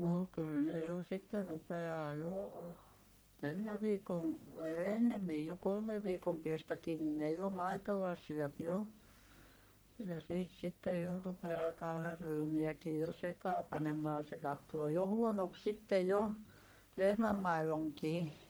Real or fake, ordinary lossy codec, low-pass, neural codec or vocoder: fake; none; none; codec, 44.1 kHz, 1.7 kbps, Pupu-Codec